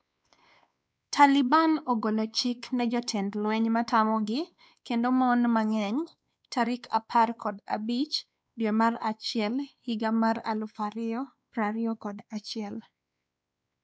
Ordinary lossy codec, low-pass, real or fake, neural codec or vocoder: none; none; fake; codec, 16 kHz, 2 kbps, X-Codec, WavLM features, trained on Multilingual LibriSpeech